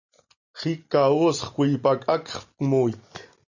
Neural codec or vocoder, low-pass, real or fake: none; 7.2 kHz; real